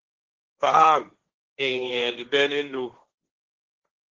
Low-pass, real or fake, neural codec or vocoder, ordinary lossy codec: 7.2 kHz; fake; codec, 16 kHz, 1.1 kbps, Voila-Tokenizer; Opus, 16 kbps